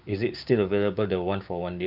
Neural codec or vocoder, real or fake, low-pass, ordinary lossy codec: codec, 16 kHz, 6 kbps, DAC; fake; 5.4 kHz; none